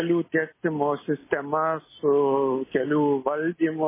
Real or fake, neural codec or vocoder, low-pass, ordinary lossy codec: real; none; 3.6 kHz; MP3, 16 kbps